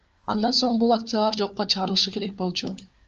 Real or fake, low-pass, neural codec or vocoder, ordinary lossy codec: fake; 7.2 kHz; codec, 16 kHz, 4 kbps, FunCodec, trained on LibriTTS, 50 frames a second; Opus, 24 kbps